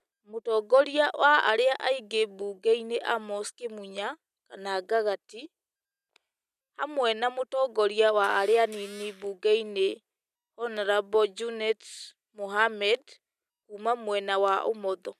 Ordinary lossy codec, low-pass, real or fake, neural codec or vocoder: none; 14.4 kHz; real; none